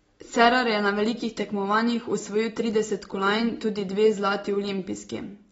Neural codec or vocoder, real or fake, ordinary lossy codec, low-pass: none; real; AAC, 24 kbps; 19.8 kHz